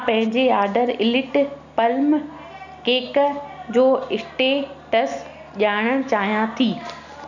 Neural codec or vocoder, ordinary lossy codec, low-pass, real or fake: none; none; 7.2 kHz; real